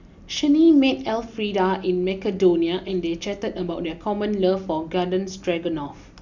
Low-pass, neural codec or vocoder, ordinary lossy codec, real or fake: 7.2 kHz; none; none; real